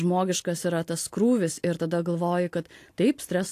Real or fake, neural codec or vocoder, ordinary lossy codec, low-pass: real; none; AAC, 64 kbps; 14.4 kHz